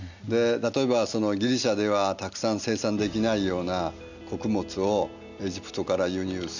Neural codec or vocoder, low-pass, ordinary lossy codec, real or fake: none; 7.2 kHz; none; real